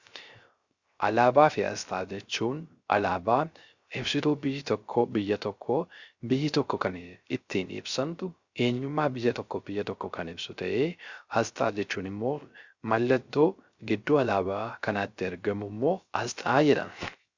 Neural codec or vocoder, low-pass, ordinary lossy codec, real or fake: codec, 16 kHz, 0.3 kbps, FocalCodec; 7.2 kHz; Opus, 64 kbps; fake